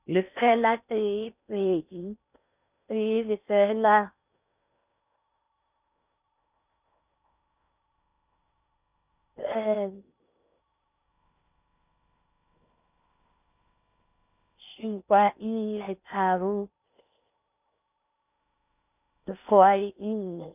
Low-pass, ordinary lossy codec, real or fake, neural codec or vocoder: 3.6 kHz; none; fake; codec, 16 kHz in and 24 kHz out, 0.6 kbps, FocalCodec, streaming, 4096 codes